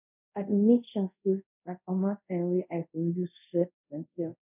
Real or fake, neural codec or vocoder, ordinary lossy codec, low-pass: fake; codec, 24 kHz, 0.5 kbps, DualCodec; none; 3.6 kHz